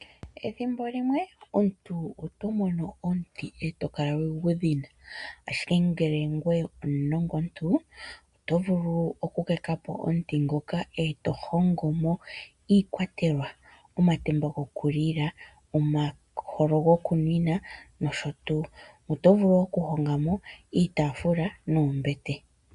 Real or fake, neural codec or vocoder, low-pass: real; none; 10.8 kHz